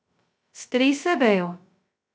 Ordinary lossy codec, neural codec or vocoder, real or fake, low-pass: none; codec, 16 kHz, 0.2 kbps, FocalCodec; fake; none